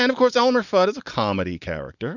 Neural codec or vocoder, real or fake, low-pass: none; real; 7.2 kHz